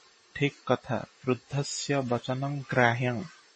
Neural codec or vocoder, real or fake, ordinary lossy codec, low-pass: vocoder, 44.1 kHz, 128 mel bands every 512 samples, BigVGAN v2; fake; MP3, 32 kbps; 10.8 kHz